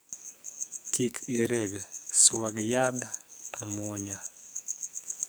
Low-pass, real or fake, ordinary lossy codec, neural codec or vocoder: none; fake; none; codec, 44.1 kHz, 2.6 kbps, SNAC